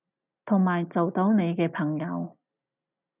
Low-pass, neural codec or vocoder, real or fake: 3.6 kHz; none; real